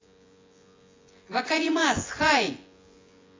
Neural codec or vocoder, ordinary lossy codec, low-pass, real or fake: vocoder, 24 kHz, 100 mel bands, Vocos; AAC, 32 kbps; 7.2 kHz; fake